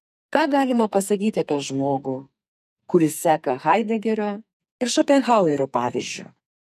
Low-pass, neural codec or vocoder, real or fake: 14.4 kHz; codec, 44.1 kHz, 2.6 kbps, SNAC; fake